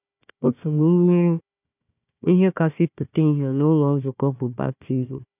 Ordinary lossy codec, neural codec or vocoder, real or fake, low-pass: none; codec, 16 kHz, 1 kbps, FunCodec, trained on Chinese and English, 50 frames a second; fake; 3.6 kHz